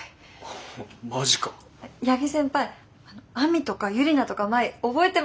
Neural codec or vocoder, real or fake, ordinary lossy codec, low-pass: none; real; none; none